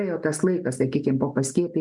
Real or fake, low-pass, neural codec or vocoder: real; 10.8 kHz; none